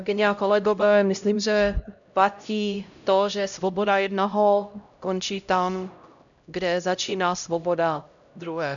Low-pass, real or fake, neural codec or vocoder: 7.2 kHz; fake; codec, 16 kHz, 0.5 kbps, X-Codec, HuBERT features, trained on LibriSpeech